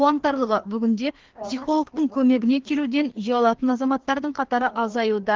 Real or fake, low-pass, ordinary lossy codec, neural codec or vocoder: fake; 7.2 kHz; Opus, 16 kbps; codec, 16 kHz, 2 kbps, FreqCodec, larger model